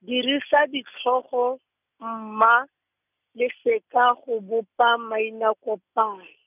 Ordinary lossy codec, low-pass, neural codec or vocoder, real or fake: none; 3.6 kHz; none; real